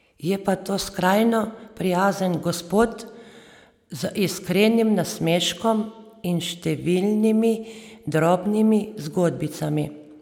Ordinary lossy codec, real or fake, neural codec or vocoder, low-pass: none; real; none; 19.8 kHz